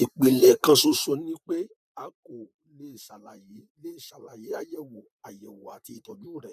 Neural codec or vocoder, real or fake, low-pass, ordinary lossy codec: vocoder, 44.1 kHz, 128 mel bands, Pupu-Vocoder; fake; 14.4 kHz; none